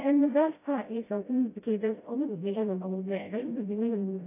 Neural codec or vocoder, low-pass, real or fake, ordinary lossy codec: codec, 16 kHz, 0.5 kbps, FreqCodec, smaller model; 3.6 kHz; fake; AAC, 24 kbps